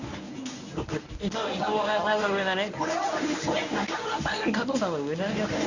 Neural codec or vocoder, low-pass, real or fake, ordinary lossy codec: codec, 24 kHz, 0.9 kbps, WavTokenizer, medium speech release version 1; 7.2 kHz; fake; none